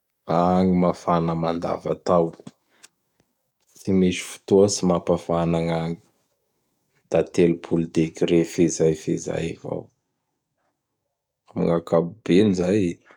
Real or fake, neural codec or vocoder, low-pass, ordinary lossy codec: fake; codec, 44.1 kHz, 7.8 kbps, DAC; 19.8 kHz; none